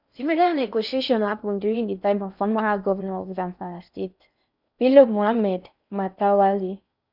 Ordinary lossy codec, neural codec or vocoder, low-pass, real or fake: none; codec, 16 kHz in and 24 kHz out, 0.6 kbps, FocalCodec, streaming, 4096 codes; 5.4 kHz; fake